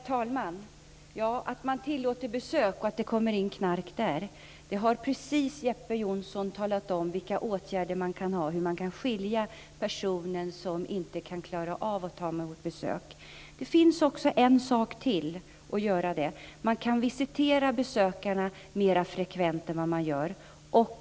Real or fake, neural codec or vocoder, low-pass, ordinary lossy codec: real; none; none; none